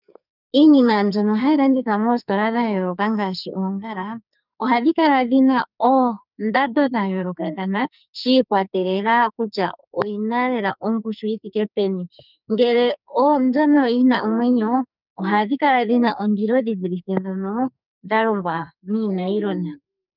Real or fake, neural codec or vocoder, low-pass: fake; codec, 44.1 kHz, 2.6 kbps, SNAC; 5.4 kHz